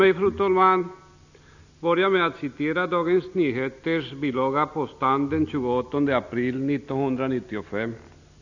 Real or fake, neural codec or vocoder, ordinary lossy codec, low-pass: real; none; none; 7.2 kHz